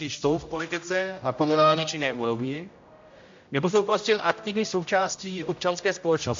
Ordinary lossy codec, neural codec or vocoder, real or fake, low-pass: MP3, 48 kbps; codec, 16 kHz, 0.5 kbps, X-Codec, HuBERT features, trained on general audio; fake; 7.2 kHz